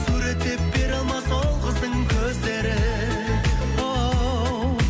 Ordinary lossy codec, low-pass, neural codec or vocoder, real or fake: none; none; none; real